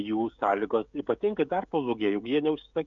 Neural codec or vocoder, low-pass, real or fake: codec, 16 kHz, 16 kbps, FreqCodec, smaller model; 7.2 kHz; fake